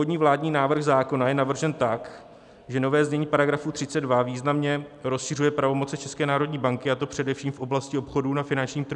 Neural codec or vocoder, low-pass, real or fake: none; 10.8 kHz; real